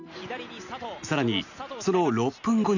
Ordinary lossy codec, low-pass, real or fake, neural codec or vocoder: none; 7.2 kHz; real; none